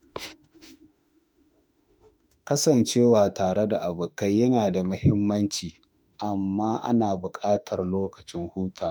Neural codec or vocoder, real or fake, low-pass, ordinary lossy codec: autoencoder, 48 kHz, 32 numbers a frame, DAC-VAE, trained on Japanese speech; fake; none; none